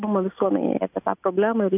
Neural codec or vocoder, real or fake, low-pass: none; real; 3.6 kHz